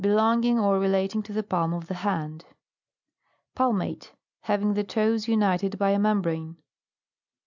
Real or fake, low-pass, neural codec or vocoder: real; 7.2 kHz; none